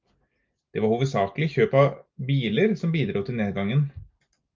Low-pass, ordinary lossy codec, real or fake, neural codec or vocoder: 7.2 kHz; Opus, 24 kbps; real; none